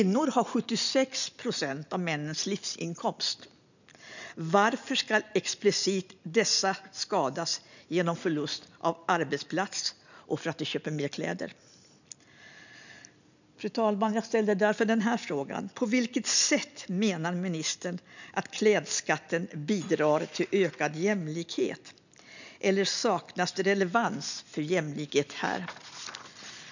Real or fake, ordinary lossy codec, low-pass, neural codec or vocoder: real; none; 7.2 kHz; none